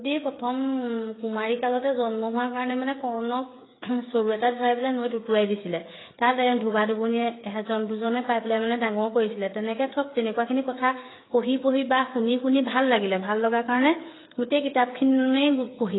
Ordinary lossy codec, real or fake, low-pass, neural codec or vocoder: AAC, 16 kbps; fake; 7.2 kHz; codec, 16 kHz, 8 kbps, FreqCodec, smaller model